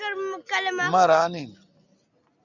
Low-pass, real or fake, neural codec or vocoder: 7.2 kHz; real; none